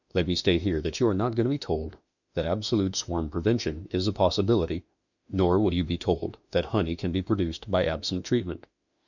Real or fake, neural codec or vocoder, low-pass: fake; autoencoder, 48 kHz, 32 numbers a frame, DAC-VAE, trained on Japanese speech; 7.2 kHz